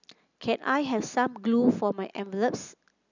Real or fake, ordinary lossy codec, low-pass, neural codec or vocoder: real; none; 7.2 kHz; none